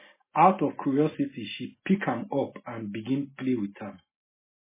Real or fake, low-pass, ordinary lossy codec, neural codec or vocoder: real; 3.6 kHz; MP3, 16 kbps; none